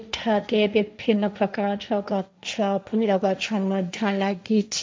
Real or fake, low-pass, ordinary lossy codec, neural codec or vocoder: fake; none; none; codec, 16 kHz, 1.1 kbps, Voila-Tokenizer